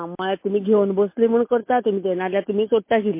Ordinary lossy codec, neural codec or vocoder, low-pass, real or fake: MP3, 24 kbps; none; 3.6 kHz; real